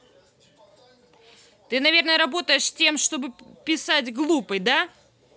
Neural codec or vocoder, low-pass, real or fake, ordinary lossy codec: none; none; real; none